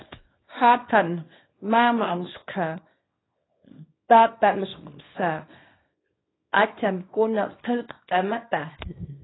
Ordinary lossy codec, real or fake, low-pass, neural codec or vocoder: AAC, 16 kbps; fake; 7.2 kHz; codec, 24 kHz, 0.9 kbps, WavTokenizer, small release